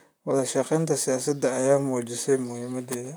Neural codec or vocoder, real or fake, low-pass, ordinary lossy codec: vocoder, 44.1 kHz, 128 mel bands, Pupu-Vocoder; fake; none; none